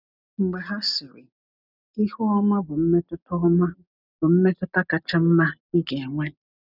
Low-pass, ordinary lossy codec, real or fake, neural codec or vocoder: 5.4 kHz; none; real; none